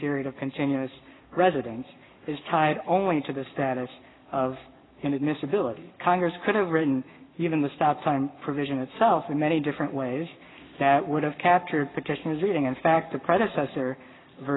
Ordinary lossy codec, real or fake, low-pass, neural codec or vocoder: AAC, 16 kbps; fake; 7.2 kHz; codec, 44.1 kHz, 7.8 kbps, Pupu-Codec